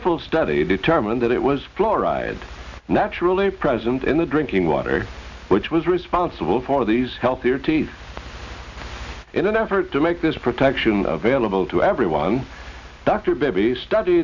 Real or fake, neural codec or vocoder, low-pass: real; none; 7.2 kHz